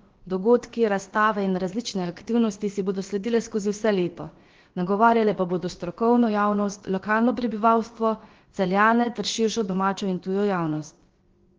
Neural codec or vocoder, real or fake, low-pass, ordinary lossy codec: codec, 16 kHz, about 1 kbps, DyCAST, with the encoder's durations; fake; 7.2 kHz; Opus, 16 kbps